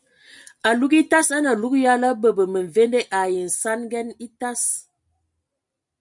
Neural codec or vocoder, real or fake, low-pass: none; real; 10.8 kHz